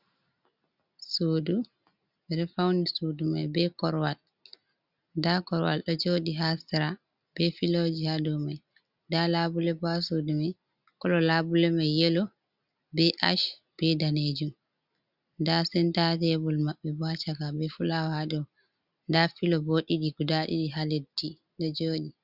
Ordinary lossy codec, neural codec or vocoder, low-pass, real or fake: Opus, 64 kbps; none; 5.4 kHz; real